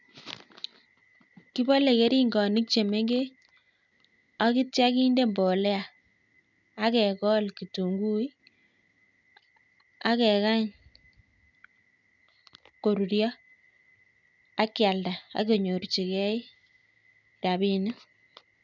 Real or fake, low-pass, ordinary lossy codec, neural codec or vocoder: real; 7.2 kHz; none; none